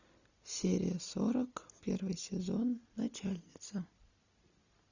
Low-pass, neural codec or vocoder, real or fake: 7.2 kHz; none; real